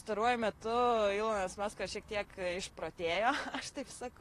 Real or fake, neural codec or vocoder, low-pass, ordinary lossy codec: fake; vocoder, 44.1 kHz, 128 mel bands, Pupu-Vocoder; 14.4 kHz; AAC, 48 kbps